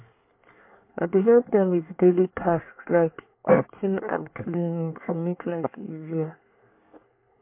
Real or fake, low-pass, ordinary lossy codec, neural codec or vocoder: fake; 3.6 kHz; MP3, 32 kbps; codec, 24 kHz, 1 kbps, SNAC